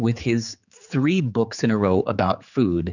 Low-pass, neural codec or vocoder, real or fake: 7.2 kHz; codec, 16 kHz, 4 kbps, X-Codec, HuBERT features, trained on general audio; fake